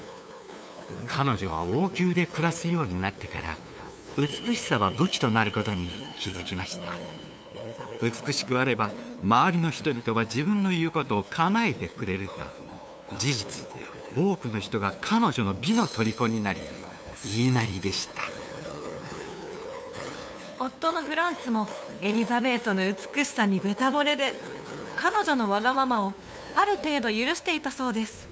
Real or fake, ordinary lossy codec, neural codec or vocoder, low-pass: fake; none; codec, 16 kHz, 2 kbps, FunCodec, trained on LibriTTS, 25 frames a second; none